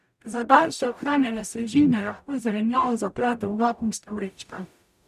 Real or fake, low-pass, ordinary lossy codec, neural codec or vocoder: fake; 14.4 kHz; none; codec, 44.1 kHz, 0.9 kbps, DAC